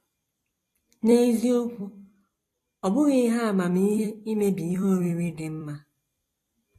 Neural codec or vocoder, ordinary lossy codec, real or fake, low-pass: vocoder, 44.1 kHz, 128 mel bands every 256 samples, BigVGAN v2; AAC, 48 kbps; fake; 14.4 kHz